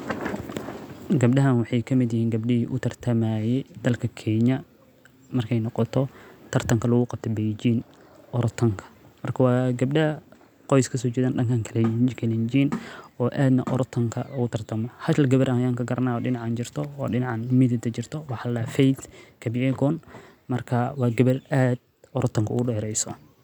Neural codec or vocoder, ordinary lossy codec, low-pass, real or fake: none; none; 19.8 kHz; real